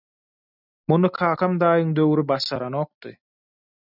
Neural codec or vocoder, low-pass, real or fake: none; 5.4 kHz; real